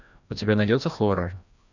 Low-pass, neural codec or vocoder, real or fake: 7.2 kHz; codec, 16 kHz, 1 kbps, FreqCodec, larger model; fake